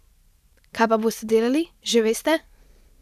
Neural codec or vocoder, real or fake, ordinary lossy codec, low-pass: vocoder, 44.1 kHz, 128 mel bands every 512 samples, BigVGAN v2; fake; none; 14.4 kHz